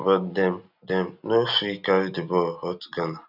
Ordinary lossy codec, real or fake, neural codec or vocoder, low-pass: none; real; none; 5.4 kHz